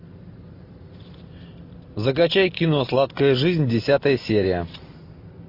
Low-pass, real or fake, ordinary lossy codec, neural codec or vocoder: 5.4 kHz; real; MP3, 32 kbps; none